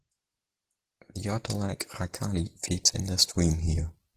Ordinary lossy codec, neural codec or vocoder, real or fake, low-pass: Opus, 16 kbps; none; real; 14.4 kHz